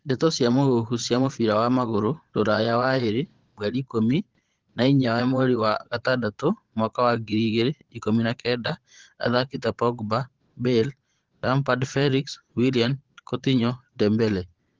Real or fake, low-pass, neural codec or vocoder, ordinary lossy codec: fake; 7.2 kHz; vocoder, 22.05 kHz, 80 mel bands, Vocos; Opus, 16 kbps